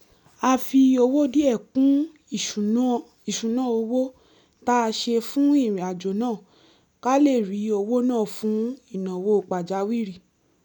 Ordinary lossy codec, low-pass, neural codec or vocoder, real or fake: none; 19.8 kHz; none; real